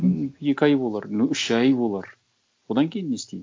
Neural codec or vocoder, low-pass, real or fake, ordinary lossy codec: none; none; real; none